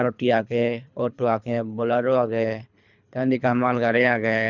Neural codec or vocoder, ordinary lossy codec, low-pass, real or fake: codec, 24 kHz, 3 kbps, HILCodec; none; 7.2 kHz; fake